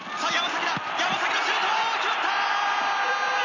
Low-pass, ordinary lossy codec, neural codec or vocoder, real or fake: 7.2 kHz; none; none; real